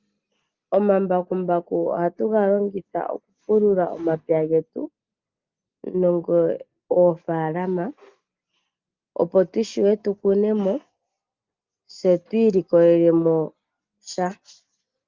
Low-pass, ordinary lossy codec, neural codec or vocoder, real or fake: 7.2 kHz; Opus, 32 kbps; none; real